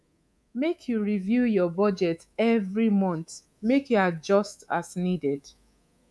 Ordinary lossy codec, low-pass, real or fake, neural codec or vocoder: none; none; fake; codec, 24 kHz, 3.1 kbps, DualCodec